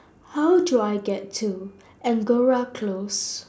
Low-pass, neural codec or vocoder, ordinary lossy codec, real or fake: none; none; none; real